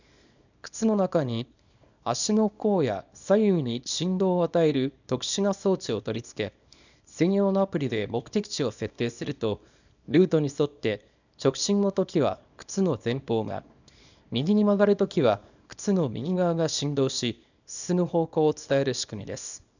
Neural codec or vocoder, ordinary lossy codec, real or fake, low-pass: codec, 24 kHz, 0.9 kbps, WavTokenizer, small release; none; fake; 7.2 kHz